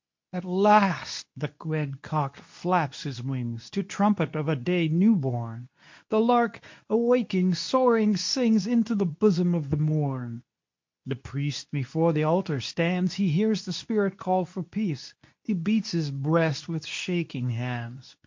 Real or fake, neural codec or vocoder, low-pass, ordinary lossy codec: fake; codec, 24 kHz, 0.9 kbps, WavTokenizer, medium speech release version 2; 7.2 kHz; MP3, 48 kbps